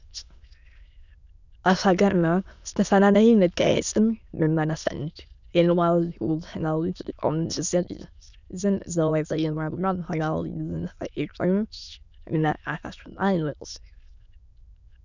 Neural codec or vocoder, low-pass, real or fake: autoencoder, 22.05 kHz, a latent of 192 numbers a frame, VITS, trained on many speakers; 7.2 kHz; fake